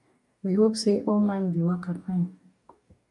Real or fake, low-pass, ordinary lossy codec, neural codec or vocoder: fake; 10.8 kHz; MP3, 64 kbps; codec, 44.1 kHz, 2.6 kbps, DAC